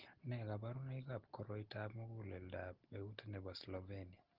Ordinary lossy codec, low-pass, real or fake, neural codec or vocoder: Opus, 16 kbps; 5.4 kHz; real; none